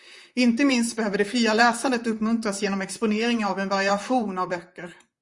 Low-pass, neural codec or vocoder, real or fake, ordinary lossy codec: 10.8 kHz; vocoder, 44.1 kHz, 128 mel bands, Pupu-Vocoder; fake; Opus, 64 kbps